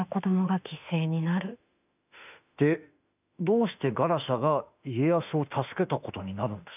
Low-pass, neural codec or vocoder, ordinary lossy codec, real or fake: 3.6 kHz; autoencoder, 48 kHz, 32 numbers a frame, DAC-VAE, trained on Japanese speech; none; fake